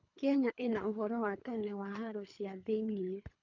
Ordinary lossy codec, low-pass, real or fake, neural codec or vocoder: none; 7.2 kHz; fake; codec, 24 kHz, 3 kbps, HILCodec